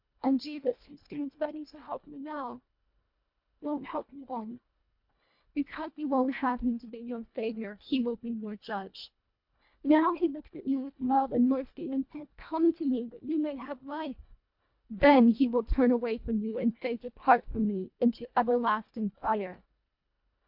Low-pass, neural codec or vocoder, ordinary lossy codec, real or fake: 5.4 kHz; codec, 24 kHz, 1.5 kbps, HILCodec; MP3, 48 kbps; fake